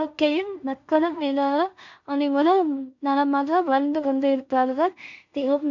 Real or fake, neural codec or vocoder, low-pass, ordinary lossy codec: fake; codec, 16 kHz in and 24 kHz out, 0.4 kbps, LongCat-Audio-Codec, two codebook decoder; 7.2 kHz; none